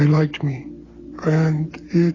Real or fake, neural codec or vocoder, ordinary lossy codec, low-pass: real; none; AAC, 32 kbps; 7.2 kHz